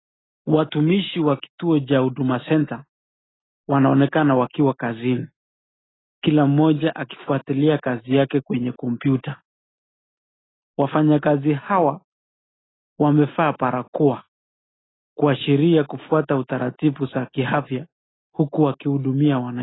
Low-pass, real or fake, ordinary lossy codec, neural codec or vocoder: 7.2 kHz; real; AAC, 16 kbps; none